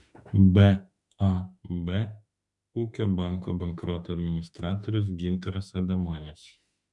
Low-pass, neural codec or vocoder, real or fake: 10.8 kHz; autoencoder, 48 kHz, 32 numbers a frame, DAC-VAE, trained on Japanese speech; fake